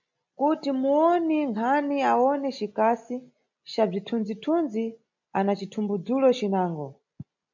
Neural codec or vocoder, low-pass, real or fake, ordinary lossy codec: none; 7.2 kHz; real; MP3, 64 kbps